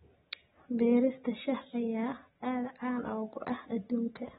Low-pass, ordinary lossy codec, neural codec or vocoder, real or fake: 19.8 kHz; AAC, 16 kbps; none; real